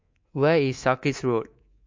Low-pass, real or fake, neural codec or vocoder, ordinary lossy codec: 7.2 kHz; fake; codec, 24 kHz, 3.1 kbps, DualCodec; MP3, 48 kbps